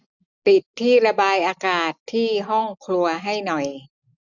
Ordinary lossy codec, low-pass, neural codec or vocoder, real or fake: none; 7.2 kHz; none; real